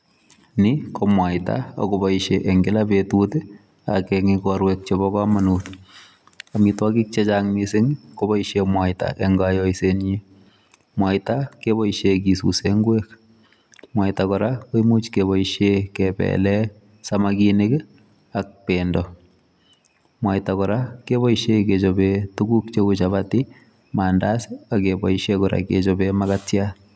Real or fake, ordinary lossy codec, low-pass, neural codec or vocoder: real; none; none; none